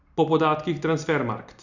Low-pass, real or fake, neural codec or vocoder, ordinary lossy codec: 7.2 kHz; real; none; none